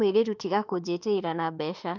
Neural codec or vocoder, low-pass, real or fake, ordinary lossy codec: autoencoder, 48 kHz, 32 numbers a frame, DAC-VAE, trained on Japanese speech; 7.2 kHz; fake; none